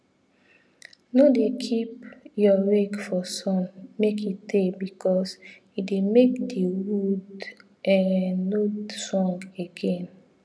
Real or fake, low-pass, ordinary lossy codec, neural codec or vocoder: real; none; none; none